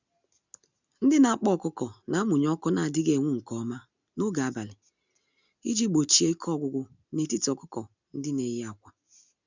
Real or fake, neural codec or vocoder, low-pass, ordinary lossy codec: real; none; 7.2 kHz; none